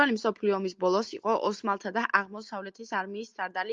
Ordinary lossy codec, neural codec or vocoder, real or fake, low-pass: Opus, 32 kbps; none; real; 7.2 kHz